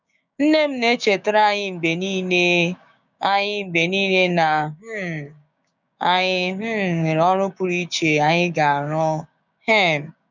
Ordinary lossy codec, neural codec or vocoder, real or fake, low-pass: none; codec, 16 kHz, 6 kbps, DAC; fake; 7.2 kHz